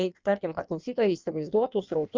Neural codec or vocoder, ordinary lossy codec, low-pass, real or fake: codec, 16 kHz in and 24 kHz out, 1.1 kbps, FireRedTTS-2 codec; Opus, 32 kbps; 7.2 kHz; fake